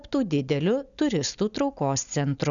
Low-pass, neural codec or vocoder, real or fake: 7.2 kHz; none; real